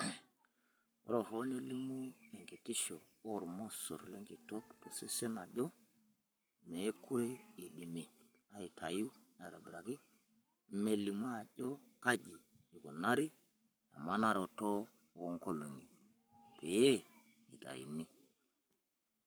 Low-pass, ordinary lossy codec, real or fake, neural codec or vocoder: none; none; fake; codec, 44.1 kHz, 7.8 kbps, Pupu-Codec